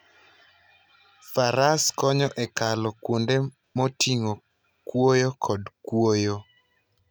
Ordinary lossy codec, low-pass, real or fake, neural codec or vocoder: none; none; real; none